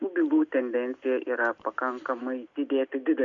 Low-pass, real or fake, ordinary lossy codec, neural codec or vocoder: 7.2 kHz; real; Opus, 64 kbps; none